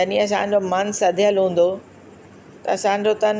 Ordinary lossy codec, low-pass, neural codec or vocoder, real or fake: none; none; none; real